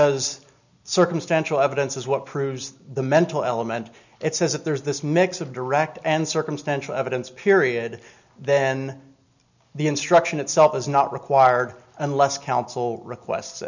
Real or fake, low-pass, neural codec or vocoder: real; 7.2 kHz; none